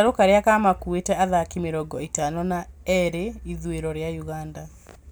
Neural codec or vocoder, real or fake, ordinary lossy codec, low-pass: none; real; none; none